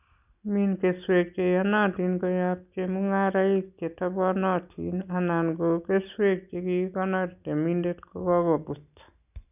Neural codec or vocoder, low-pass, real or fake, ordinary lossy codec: none; 3.6 kHz; real; none